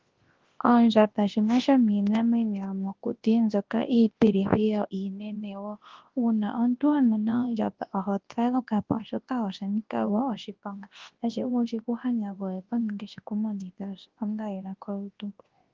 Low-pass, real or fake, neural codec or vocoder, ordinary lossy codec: 7.2 kHz; fake; codec, 24 kHz, 0.9 kbps, WavTokenizer, large speech release; Opus, 16 kbps